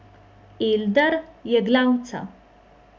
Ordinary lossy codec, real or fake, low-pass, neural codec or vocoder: none; real; none; none